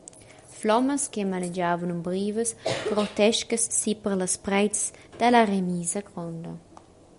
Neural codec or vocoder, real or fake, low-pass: none; real; 10.8 kHz